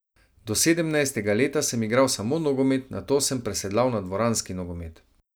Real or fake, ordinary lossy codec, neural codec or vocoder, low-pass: real; none; none; none